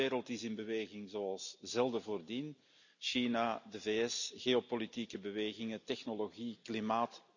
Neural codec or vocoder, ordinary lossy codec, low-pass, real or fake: none; none; 7.2 kHz; real